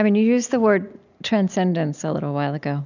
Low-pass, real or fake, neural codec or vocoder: 7.2 kHz; real; none